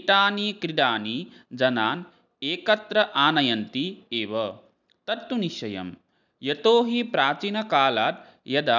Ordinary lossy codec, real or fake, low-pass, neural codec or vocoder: none; real; 7.2 kHz; none